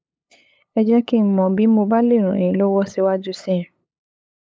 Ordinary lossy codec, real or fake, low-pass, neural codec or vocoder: none; fake; none; codec, 16 kHz, 8 kbps, FunCodec, trained on LibriTTS, 25 frames a second